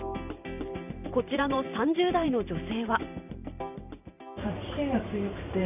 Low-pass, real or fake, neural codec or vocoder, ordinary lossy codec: 3.6 kHz; fake; vocoder, 44.1 kHz, 128 mel bands every 512 samples, BigVGAN v2; none